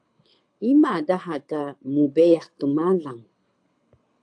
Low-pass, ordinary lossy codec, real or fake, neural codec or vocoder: 9.9 kHz; AAC, 64 kbps; fake; codec, 24 kHz, 6 kbps, HILCodec